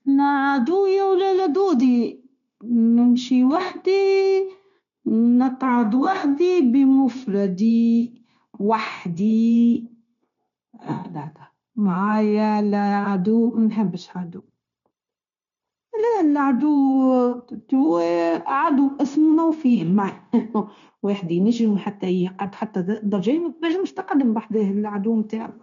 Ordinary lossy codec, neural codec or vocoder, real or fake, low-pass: none; codec, 16 kHz, 0.9 kbps, LongCat-Audio-Codec; fake; 7.2 kHz